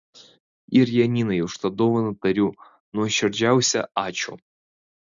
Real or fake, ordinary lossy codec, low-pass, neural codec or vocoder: real; Opus, 64 kbps; 7.2 kHz; none